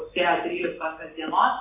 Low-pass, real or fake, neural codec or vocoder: 3.6 kHz; real; none